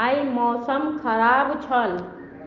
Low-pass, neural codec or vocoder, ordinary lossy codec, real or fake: 7.2 kHz; none; Opus, 32 kbps; real